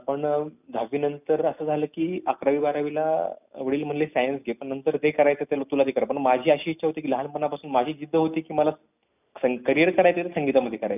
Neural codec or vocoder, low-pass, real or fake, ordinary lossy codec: none; 3.6 kHz; real; none